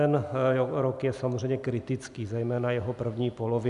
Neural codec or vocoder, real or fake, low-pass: none; real; 10.8 kHz